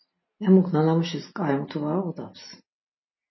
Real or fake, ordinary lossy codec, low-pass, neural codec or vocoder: real; MP3, 24 kbps; 7.2 kHz; none